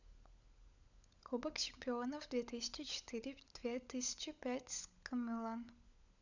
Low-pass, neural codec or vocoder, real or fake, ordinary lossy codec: 7.2 kHz; codec, 16 kHz, 16 kbps, FunCodec, trained on LibriTTS, 50 frames a second; fake; none